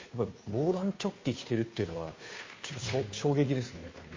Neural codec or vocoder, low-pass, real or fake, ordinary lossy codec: codec, 16 kHz, 2 kbps, FunCodec, trained on Chinese and English, 25 frames a second; 7.2 kHz; fake; MP3, 32 kbps